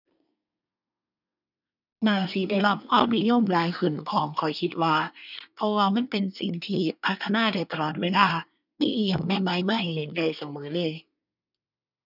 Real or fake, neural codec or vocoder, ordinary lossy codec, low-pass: fake; codec, 24 kHz, 1 kbps, SNAC; none; 5.4 kHz